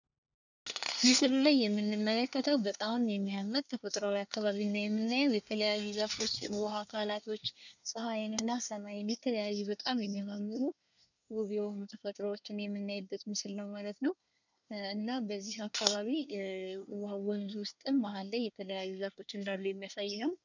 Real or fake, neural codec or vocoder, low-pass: fake; codec, 24 kHz, 1 kbps, SNAC; 7.2 kHz